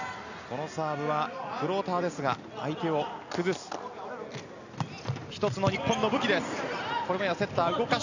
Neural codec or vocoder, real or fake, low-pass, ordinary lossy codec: none; real; 7.2 kHz; none